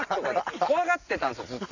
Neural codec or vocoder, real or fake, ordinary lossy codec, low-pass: none; real; none; 7.2 kHz